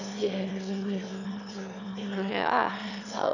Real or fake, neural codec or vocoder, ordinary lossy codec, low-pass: fake; autoencoder, 22.05 kHz, a latent of 192 numbers a frame, VITS, trained on one speaker; none; 7.2 kHz